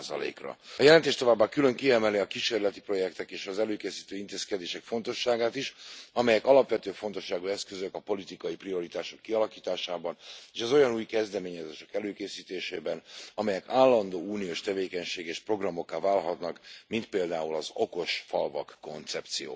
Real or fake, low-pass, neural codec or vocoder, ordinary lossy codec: real; none; none; none